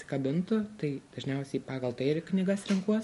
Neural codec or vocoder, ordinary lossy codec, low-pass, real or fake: none; MP3, 48 kbps; 14.4 kHz; real